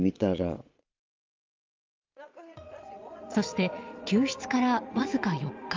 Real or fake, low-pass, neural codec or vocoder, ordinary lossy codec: fake; 7.2 kHz; vocoder, 22.05 kHz, 80 mel bands, Vocos; Opus, 32 kbps